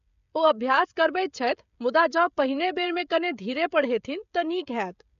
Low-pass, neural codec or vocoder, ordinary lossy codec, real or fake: 7.2 kHz; codec, 16 kHz, 16 kbps, FreqCodec, smaller model; none; fake